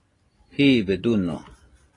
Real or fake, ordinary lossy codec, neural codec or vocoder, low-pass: real; AAC, 32 kbps; none; 10.8 kHz